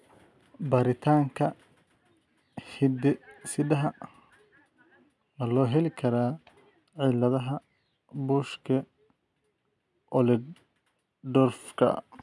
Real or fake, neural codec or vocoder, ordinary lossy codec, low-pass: real; none; none; none